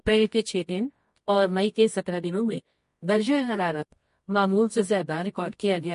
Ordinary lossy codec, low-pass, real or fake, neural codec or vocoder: MP3, 48 kbps; 10.8 kHz; fake; codec, 24 kHz, 0.9 kbps, WavTokenizer, medium music audio release